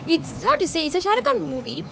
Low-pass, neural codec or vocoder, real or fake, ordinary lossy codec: none; codec, 16 kHz, 4 kbps, X-Codec, HuBERT features, trained on LibriSpeech; fake; none